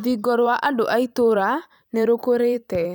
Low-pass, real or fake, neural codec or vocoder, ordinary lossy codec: none; fake; vocoder, 44.1 kHz, 128 mel bands every 512 samples, BigVGAN v2; none